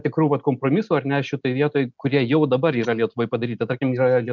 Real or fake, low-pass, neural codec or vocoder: real; 7.2 kHz; none